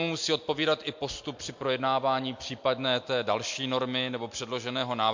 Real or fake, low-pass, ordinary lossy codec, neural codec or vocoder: real; 7.2 kHz; MP3, 48 kbps; none